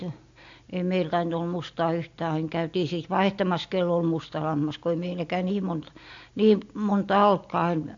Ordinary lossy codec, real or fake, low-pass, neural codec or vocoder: AAC, 48 kbps; real; 7.2 kHz; none